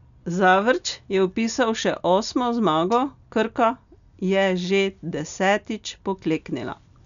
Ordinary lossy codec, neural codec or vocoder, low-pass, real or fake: none; none; 7.2 kHz; real